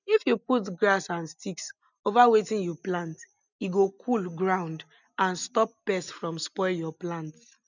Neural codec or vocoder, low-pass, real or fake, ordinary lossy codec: none; 7.2 kHz; real; none